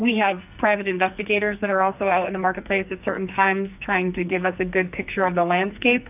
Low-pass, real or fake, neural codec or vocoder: 3.6 kHz; fake; codec, 44.1 kHz, 2.6 kbps, SNAC